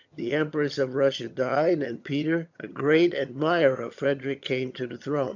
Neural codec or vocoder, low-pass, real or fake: vocoder, 22.05 kHz, 80 mel bands, HiFi-GAN; 7.2 kHz; fake